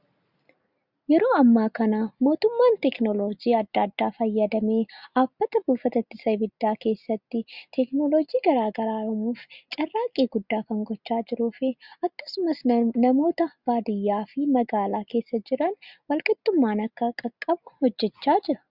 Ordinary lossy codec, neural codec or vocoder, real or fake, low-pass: Opus, 64 kbps; none; real; 5.4 kHz